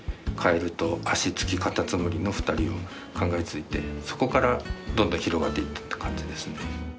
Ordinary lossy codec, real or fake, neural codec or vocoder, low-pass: none; real; none; none